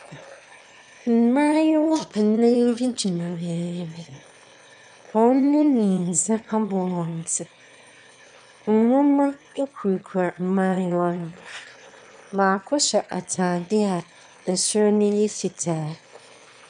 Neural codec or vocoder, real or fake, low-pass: autoencoder, 22.05 kHz, a latent of 192 numbers a frame, VITS, trained on one speaker; fake; 9.9 kHz